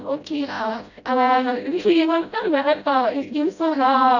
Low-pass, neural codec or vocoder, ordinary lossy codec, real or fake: 7.2 kHz; codec, 16 kHz, 0.5 kbps, FreqCodec, smaller model; none; fake